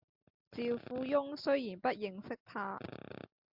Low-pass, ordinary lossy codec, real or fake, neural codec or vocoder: 5.4 kHz; MP3, 48 kbps; real; none